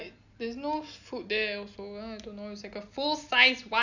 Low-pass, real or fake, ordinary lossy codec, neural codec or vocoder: 7.2 kHz; real; none; none